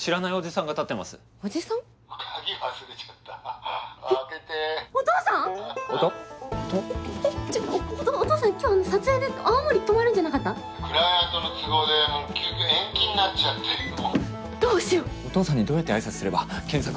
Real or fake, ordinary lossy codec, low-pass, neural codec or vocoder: real; none; none; none